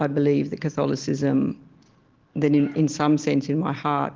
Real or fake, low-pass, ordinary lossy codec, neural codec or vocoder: real; 7.2 kHz; Opus, 16 kbps; none